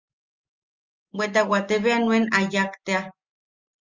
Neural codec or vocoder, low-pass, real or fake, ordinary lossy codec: none; 7.2 kHz; real; Opus, 32 kbps